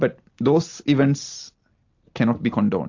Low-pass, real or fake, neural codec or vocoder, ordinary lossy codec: 7.2 kHz; real; none; AAC, 48 kbps